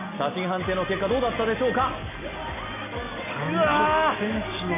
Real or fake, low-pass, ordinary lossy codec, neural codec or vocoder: real; 3.6 kHz; MP3, 24 kbps; none